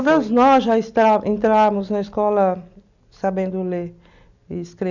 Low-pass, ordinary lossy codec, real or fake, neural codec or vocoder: 7.2 kHz; none; real; none